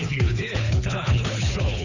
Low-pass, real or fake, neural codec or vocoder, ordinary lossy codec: 7.2 kHz; fake; codec, 24 kHz, 6 kbps, HILCodec; none